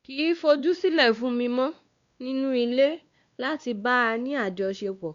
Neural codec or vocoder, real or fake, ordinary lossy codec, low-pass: codec, 16 kHz, 2 kbps, X-Codec, WavLM features, trained on Multilingual LibriSpeech; fake; Opus, 64 kbps; 7.2 kHz